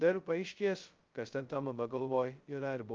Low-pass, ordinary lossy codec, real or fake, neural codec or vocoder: 7.2 kHz; Opus, 24 kbps; fake; codec, 16 kHz, 0.2 kbps, FocalCodec